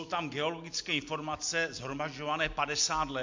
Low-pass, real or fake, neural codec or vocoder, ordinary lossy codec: 7.2 kHz; real; none; MP3, 48 kbps